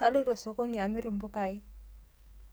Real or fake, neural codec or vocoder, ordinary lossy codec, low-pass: fake; codec, 44.1 kHz, 2.6 kbps, SNAC; none; none